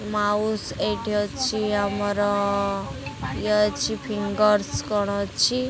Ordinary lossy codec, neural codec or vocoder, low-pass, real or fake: none; none; none; real